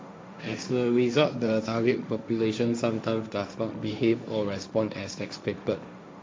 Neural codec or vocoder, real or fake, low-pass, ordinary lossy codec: codec, 16 kHz, 1.1 kbps, Voila-Tokenizer; fake; none; none